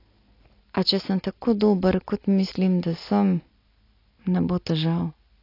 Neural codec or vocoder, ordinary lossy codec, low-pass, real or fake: none; AAC, 32 kbps; 5.4 kHz; real